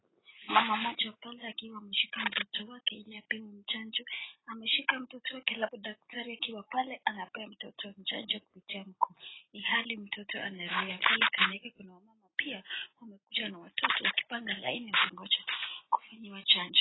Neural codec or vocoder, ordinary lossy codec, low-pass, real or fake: none; AAC, 16 kbps; 7.2 kHz; real